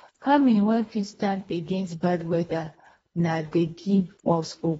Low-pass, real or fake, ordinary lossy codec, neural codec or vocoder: 10.8 kHz; fake; AAC, 24 kbps; codec, 24 kHz, 1.5 kbps, HILCodec